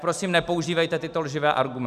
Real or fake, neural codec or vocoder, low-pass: real; none; 14.4 kHz